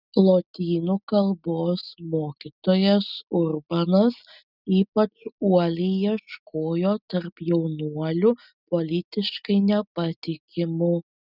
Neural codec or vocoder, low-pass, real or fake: none; 5.4 kHz; real